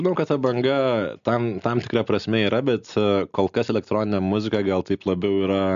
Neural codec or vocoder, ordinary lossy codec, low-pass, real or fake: none; AAC, 96 kbps; 7.2 kHz; real